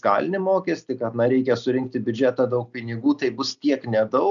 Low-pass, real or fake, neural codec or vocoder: 7.2 kHz; real; none